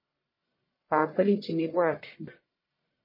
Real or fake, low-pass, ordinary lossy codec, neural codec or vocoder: fake; 5.4 kHz; MP3, 24 kbps; codec, 44.1 kHz, 1.7 kbps, Pupu-Codec